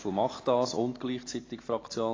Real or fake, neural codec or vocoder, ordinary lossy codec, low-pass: real; none; AAC, 32 kbps; 7.2 kHz